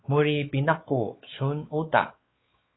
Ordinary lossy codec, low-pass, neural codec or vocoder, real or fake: AAC, 16 kbps; 7.2 kHz; codec, 16 kHz, 4 kbps, X-Codec, WavLM features, trained on Multilingual LibriSpeech; fake